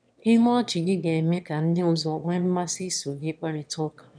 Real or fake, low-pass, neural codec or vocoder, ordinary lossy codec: fake; 9.9 kHz; autoencoder, 22.05 kHz, a latent of 192 numbers a frame, VITS, trained on one speaker; none